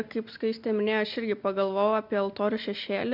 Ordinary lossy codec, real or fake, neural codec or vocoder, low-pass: MP3, 48 kbps; real; none; 5.4 kHz